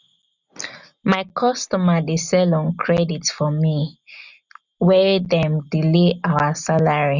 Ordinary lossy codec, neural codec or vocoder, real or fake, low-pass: none; none; real; 7.2 kHz